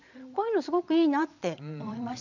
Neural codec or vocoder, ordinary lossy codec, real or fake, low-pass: vocoder, 22.05 kHz, 80 mel bands, Vocos; none; fake; 7.2 kHz